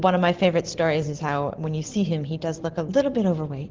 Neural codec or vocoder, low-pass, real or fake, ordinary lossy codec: none; 7.2 kHz; real; Opus, 16 kbps